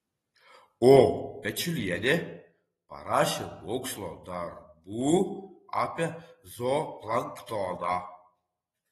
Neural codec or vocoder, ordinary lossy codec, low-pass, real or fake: none; AAC, 32 kbps; 19.8 kHz; real